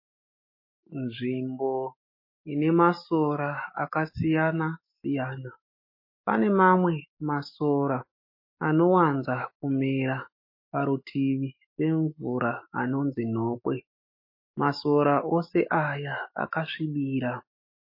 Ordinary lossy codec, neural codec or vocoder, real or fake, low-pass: MP3, 24 kbps; autoencoder, 48 kHz, 128 numbers a frame, DAC-VAE, trained on Japanese speech; fake; 5.4 kHz